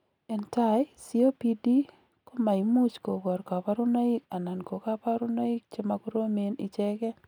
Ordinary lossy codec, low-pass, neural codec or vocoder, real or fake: none; 19.8 kHz; none; real